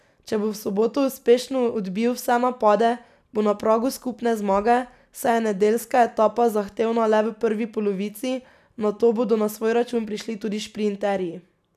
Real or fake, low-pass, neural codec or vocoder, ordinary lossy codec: real; 14.4 kHz; none; none